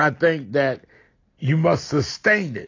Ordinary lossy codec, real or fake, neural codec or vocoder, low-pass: AAC, 32 kbps; real; none; 7.2 kHz